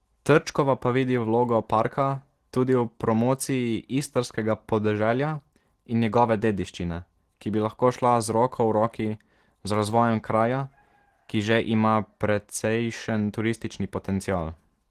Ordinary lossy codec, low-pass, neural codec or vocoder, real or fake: Opus, 16 kbps; 14.4 kHz; none; real